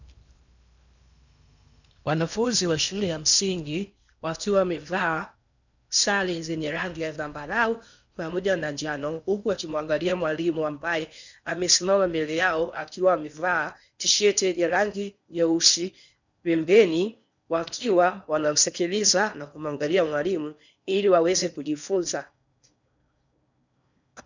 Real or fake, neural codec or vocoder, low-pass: fake; codec, 16 kHz in and 24 kHz out, 0.8 kbps, FocalCodec, streaming, 65536 codes; 7.2 kHz